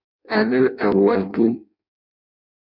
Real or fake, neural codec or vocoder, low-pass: fake; codec, 16 kHz in and 24 kHz out, 0.6 kbps, FireRedTTS-2 codec; 5.4 kHz